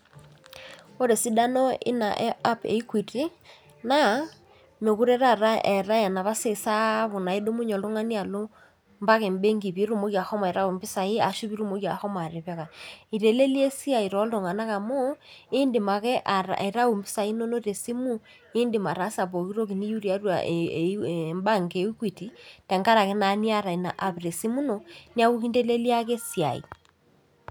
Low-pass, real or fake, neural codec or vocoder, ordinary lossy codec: none; real; none; none